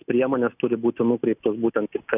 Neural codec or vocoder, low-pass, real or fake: none; 3.6 kHz; real